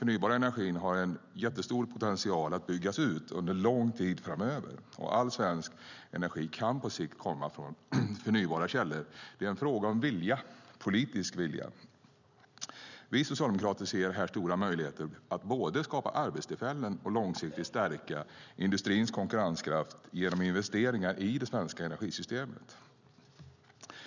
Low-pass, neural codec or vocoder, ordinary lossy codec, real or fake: 7.2 kHz; none; none; real